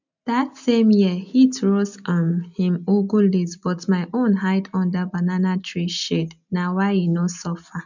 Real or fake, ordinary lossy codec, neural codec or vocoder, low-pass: real; none; none; 7.2 kHz